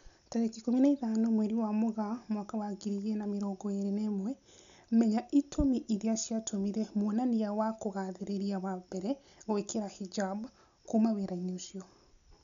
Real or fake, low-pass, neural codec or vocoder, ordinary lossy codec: real; 7.2 kHz; none; none